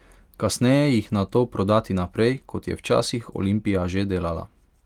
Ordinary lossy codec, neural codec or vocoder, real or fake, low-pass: Opus, 24 kbps; none; real; 19.8 kHz